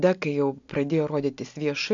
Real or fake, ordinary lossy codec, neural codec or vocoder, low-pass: real; AAC, 64 kbps; none; 7.2 kHz